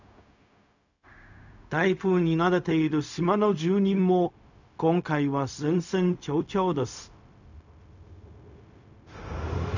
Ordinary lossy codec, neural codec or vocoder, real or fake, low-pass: none; codec, 16 kHz, 0.4 kbps, LongCat-Audio-Codec; fake; 7.2 kHz